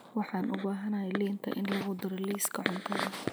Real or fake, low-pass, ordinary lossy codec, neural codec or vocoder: real; none; none; none